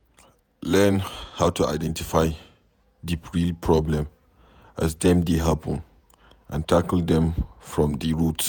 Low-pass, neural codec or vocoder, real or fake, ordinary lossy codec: none; vocoder, 48 kHz, 128 mel bands, Vocos; fake; none